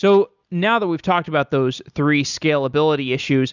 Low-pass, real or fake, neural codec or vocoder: 7.2 kHz; real; none